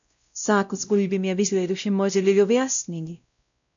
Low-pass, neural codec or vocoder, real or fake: 7.2 kHz; codec, 16 kHz, 0.5 kbps, X-Codec, WavLM features, trained on Multilingual LibriSpeech; fake